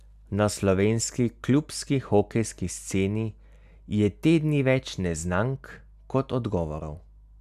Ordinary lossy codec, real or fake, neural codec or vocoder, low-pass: none; real; none; 14.4 kHz